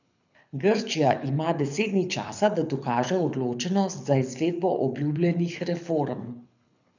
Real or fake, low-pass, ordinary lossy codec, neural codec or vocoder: fake; 7.2 kHz; none; codec, 24 kHz, 6 kbps, HILCodec